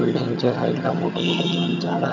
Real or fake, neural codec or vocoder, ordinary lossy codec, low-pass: fake; vocoder, 22.05 kHz, 80 mel bands, HiFi-GAN; none; 7.2 kHz